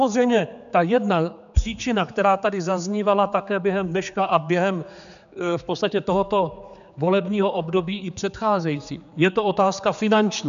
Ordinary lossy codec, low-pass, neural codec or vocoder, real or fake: MP3, 96 kbps; 7.2 kHz; codec, 16 kHz, 4 kbps, X-Codec, HuBERT features, trained on general audio; fake